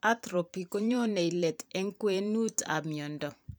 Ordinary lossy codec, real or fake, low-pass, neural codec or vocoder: none; fake; none; vocoder, 44.1 kHz, 128 mel bands every 512 samples, BigVGAN v2